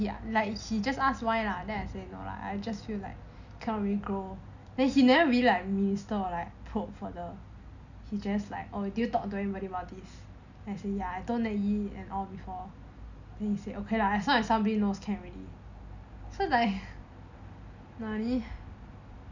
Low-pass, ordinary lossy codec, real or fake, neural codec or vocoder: 7.2 kHz; none; real; none